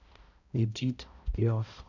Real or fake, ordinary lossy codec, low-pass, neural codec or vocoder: fake; none; 7.2 kHz; codec, 16 kHz, 0.5 kbps, X-Codec, HuBERT features, trained on balanced general audio